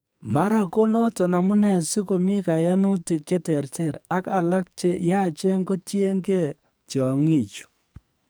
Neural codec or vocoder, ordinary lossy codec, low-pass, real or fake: codec, 44.1 kHz, 2.6 kbps, SNAC; none; none; fake